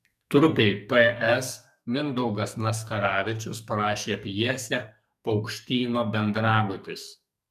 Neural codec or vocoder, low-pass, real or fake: codec, 44.1 kHz, 2.6 kbps, SNAC; 14.4 kHz; fake